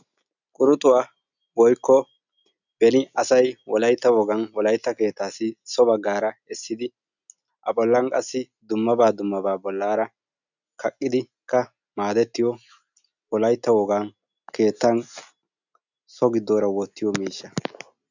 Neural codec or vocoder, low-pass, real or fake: none; 7.2 kHz; real